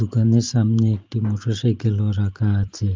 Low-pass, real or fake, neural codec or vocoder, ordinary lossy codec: 7.2 kHz; fake; vocoder, 44.1 kHz, 128 mel bands every 512 samples, BigVGAN v2; Opus, 24 kbps